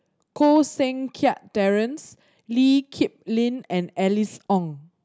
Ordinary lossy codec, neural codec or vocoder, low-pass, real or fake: none; none; none; real